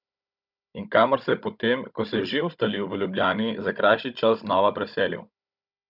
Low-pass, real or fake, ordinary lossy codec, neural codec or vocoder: 5.4 kHz; fake; none; codec, 16 kHz, 16 kbps, FunCodec, trained on Chinese and English, 50 frames a second